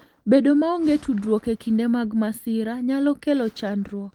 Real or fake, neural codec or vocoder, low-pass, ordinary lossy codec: real; none; 19.8 kHz; Opus, 24 kbps